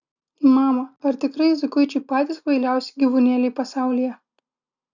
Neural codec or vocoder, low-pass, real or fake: none; 7.2 kHz; real